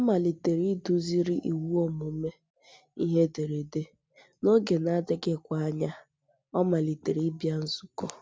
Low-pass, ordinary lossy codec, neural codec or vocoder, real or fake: none; none; none; real